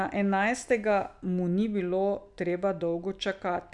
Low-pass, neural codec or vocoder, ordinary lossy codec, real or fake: 10.8 kHz; none; none; real